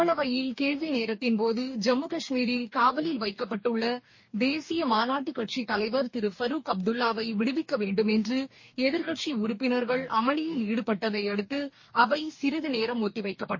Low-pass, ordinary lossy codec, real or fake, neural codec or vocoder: 7.2 kHz; MP3, 32 kbps; fake; codec, 44.1 kHz, 2.6 kbps, DAC